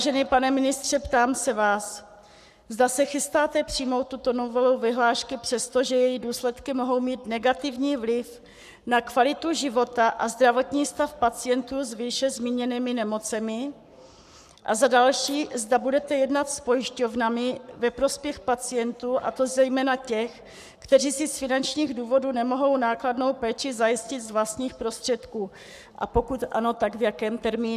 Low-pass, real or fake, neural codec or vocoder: 14.4 kHz; fake; codec, 44.1 kHz, 7.8 kbps, Pupu-Codec